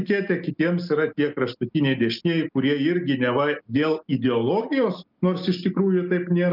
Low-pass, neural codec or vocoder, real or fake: 5.4 kHz; none; real